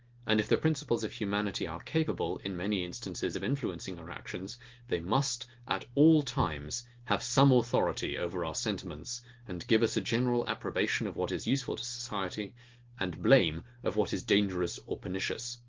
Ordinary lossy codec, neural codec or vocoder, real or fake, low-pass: Opus, 16 kbps; none; real; 7.2 kHz